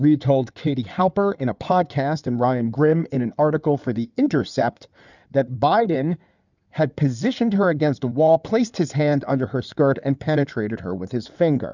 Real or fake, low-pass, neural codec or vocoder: fake; 7.2 kHz; codec, 16 kHz in and 24 kHz out, 2.2 kbps, FireRedTTS-2 codec